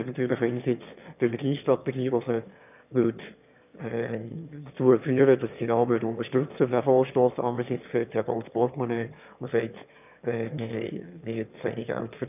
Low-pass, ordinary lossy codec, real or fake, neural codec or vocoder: 3.6 kHz; none; fake; autoencoder, 22.05 kHz, a latent of 192 numbers a frame, VITS, trained on one speaker